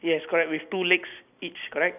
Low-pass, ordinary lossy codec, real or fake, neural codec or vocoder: 3.6 kHz; none; real; none